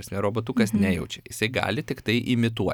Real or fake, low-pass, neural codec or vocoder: real; 19.8 kHz; none